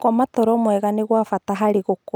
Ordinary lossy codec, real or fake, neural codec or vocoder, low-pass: none; real; none; none